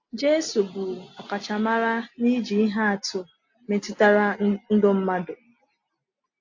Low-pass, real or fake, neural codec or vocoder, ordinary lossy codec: 7.2 kHz; real; none; AAC, 48 kbps